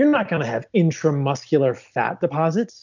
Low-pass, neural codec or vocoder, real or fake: 7.2 kHz; none; real